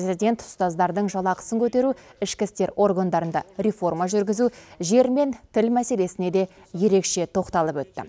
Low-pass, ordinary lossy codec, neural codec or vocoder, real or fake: none; none; none; real